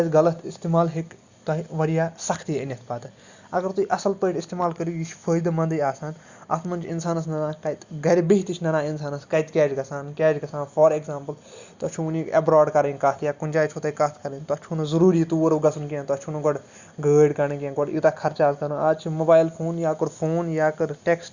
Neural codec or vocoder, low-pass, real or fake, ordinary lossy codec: none; 7.2 kHz; real; Opus, 64 kbps